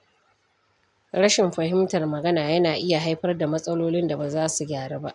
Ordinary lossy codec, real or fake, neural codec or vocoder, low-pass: none; real; none; 10.8 kHz